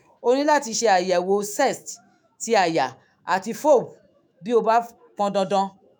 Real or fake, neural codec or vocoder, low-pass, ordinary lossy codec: fake; autoencoder, 48 kHz, 128 numbers a frame, DAC-VAE, trained on Japanese speech; none; none